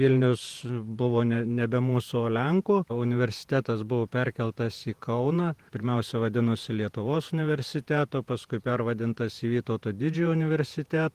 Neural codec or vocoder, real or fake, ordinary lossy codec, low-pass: vocoder, 48 kHz, 128 mel bands, Vocos; fake; Opus, 24 kbps; 14.4 kHz